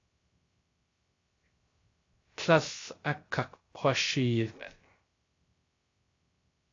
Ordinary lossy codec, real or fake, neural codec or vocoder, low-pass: AAC, 32 kbps; fake; codec, 16 kHz, 0.3 kbps, FocalCodec; 7.2 kHz